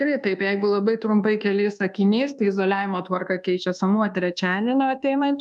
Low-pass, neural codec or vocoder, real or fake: 10.8 kHz; codec, 24 kHz, 1.2 kbps, DualCodec; fake